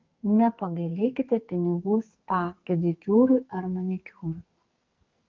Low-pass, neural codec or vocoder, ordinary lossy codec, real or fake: 7.2 kHz; codec, 44.1 kHz, 2.6 kbps, SNAC; Opus, 24 kbps; fake